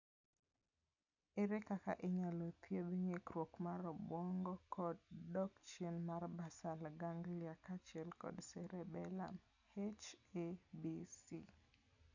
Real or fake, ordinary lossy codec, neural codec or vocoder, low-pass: real; none; none; 7.2 kHz